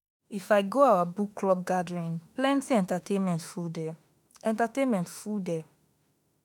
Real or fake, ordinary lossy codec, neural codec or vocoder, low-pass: fake; none; autoencoder, 48 kHz, 32 numbers a frame, DAC-VAE, trained on Japanese speech; none